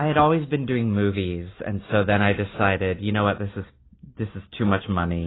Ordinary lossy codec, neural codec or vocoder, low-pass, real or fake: AAC, 16 kbps; none; 7.2 kHz; real